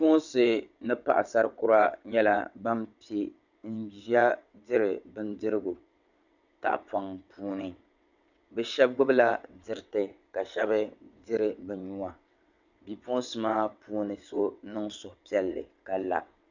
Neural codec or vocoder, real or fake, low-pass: vocoder, 22.05 kHz, 80 mel bands, WaveNeXt; fake; 7.2 kHz